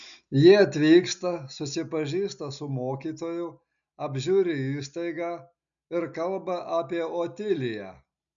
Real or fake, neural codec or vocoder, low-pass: real; none; 7.2 kHz